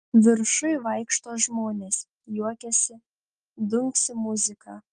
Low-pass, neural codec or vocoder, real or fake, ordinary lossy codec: 9.9 kHz; none; real; Opus, 24 kbps